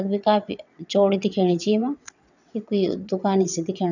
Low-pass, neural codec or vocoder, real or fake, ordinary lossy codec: 7.2 kHz; vocoder, 22.05 kHz, 80 mel bands, WaveNeXt; fake; none